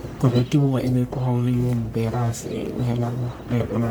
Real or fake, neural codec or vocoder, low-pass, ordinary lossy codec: fake; codec, 44.1 kHz, 1.7 kbps, Pupu-Codec; none; none